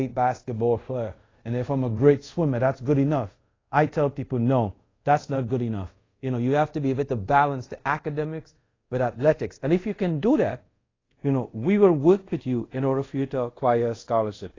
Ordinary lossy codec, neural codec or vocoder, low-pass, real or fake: AAC, 32 kbps; codec, 24 kHz, 0.5 kbps, DualCodec; 7.2 kHz; fake